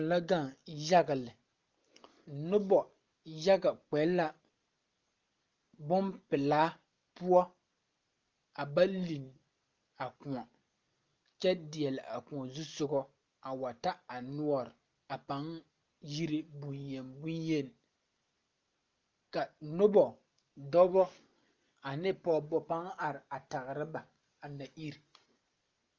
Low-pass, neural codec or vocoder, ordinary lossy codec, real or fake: 7.2 kHz; none; Opus, 32 kbps; real